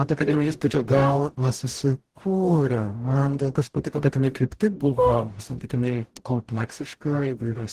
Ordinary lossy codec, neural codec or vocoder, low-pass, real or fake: Opus, 16 kbps; codec, 44.1 kHz, 0.9 kbps, DAC; 14.4 kHz; fake